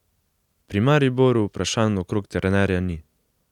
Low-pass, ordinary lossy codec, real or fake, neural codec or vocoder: 19.8 kHz; none; real; none